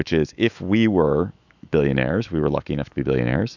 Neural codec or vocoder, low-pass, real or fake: autoencoder, 48 kHz, 128 numbers a frame, DAC-VAE, trained on Japanese speech; 7.2 kHz; fake